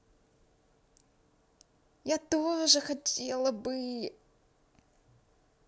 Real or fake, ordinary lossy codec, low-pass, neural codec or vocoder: real; none; none; none